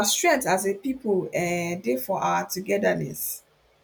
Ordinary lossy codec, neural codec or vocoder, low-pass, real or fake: none; none; none; real